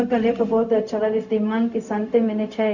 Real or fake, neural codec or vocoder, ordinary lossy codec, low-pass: fake; codec, 16 kHz, 0.4 kbps, LongCat-Audio-Codec; none; 7.2 kHz